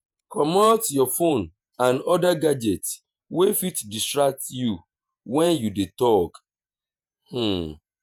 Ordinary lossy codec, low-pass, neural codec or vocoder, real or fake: none; none; vocoder, 48 kHz, 128 mel bands, Vocos; fake